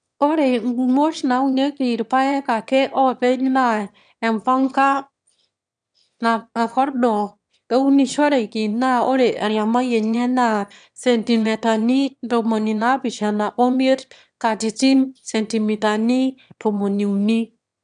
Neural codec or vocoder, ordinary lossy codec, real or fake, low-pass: autoencoder, 22.05 kHz, a latent of 192 numbers a frame, VITS, trained on one speaker; none; fake; 9.9 kHz